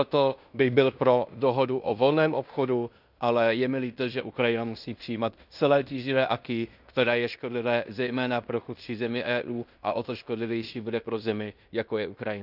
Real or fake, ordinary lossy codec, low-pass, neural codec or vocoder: fake; none; 5.4 kHz; codec, 16 kHz in and 24 kHz out, 0.9 kbps, LongCat-Audio-Codec, fine tuned four codebook decoder